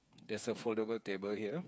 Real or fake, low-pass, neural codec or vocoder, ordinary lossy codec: fake; none; codec, 16 kHz, 8 kbps, FreqCodec, smaller model; none